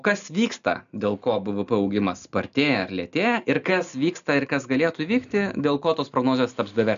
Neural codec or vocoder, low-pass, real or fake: none; 7.2 kHz; real